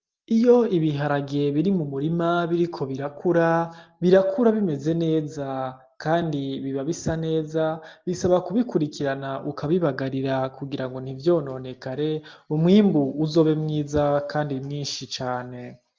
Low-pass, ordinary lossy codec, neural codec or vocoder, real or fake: 7.2 kHz; Opus, 32 kbps; none; real